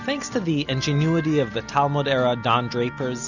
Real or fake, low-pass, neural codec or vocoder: real; 7.2 kHz; none